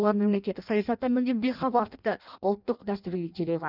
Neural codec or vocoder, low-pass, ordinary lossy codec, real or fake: codec, 16 kHz in and 24 kHz out, 0.6 kbps, FireRedTTS-2 codec; 5.4 kHz; none; fake